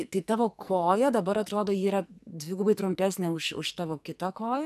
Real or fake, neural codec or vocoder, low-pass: fake; codec, 44.1 kHz, 2.6 kbps, SNAC; 14.4 kHz